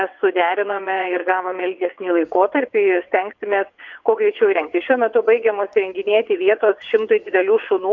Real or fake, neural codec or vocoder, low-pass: fake; vocoder, 22.05 kHz, 80 mel bands, WaveNeXt; 7.2 kHz